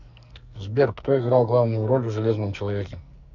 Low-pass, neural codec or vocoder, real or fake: 7.2 kHz; codec, 44.1 kHz, 2.6 kbps, SNAC; fake